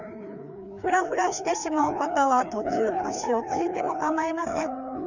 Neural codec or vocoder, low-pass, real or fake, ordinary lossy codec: codec, 16 kHz, 2 kbps, FreqCodec, larger model; 7.2 kHz; fake; none